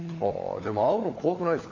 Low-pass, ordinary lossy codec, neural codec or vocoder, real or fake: 7.2 kHz; AAC, 32 kbps; codec, 16 kHz, 16 kbps, FunCodec, trained on LibriTTS, 50 frames a second; fake